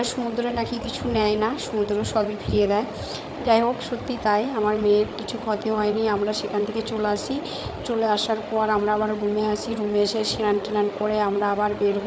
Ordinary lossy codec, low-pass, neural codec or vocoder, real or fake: none; none; codec, 16 kHz, 8 kbps, FreqCodec, larger model; fake